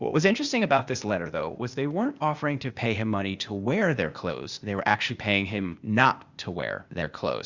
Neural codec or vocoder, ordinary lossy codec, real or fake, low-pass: codec, 16 kHz, 0.8 kbps, ZipCodec; Opus, 64 kbps; fake; 7.2 kHz